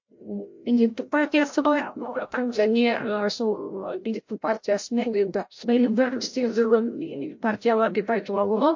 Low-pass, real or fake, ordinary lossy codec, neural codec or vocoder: 7.2 kHz; fake; MP3, 48 kbps; codec, 16 kHz, 0.5 kbps, FreqCodec, larger model